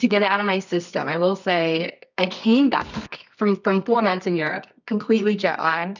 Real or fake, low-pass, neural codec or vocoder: fake; 7.2 kHz; codec, 24 kHz, 0.9 kbps, WavTokenizer, medium music audio release